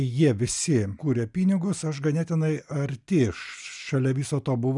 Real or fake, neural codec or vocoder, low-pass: real; none; 10.8 kHz